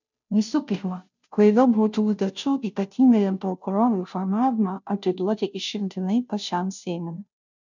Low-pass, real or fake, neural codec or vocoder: 7.2 kHz; fake; codec, 16 kHz, 0.5 kbps, FunCodec, trained on Chinese and English, 25 frames a second